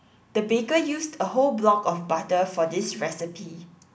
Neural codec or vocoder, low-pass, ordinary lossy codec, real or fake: none; none; none; real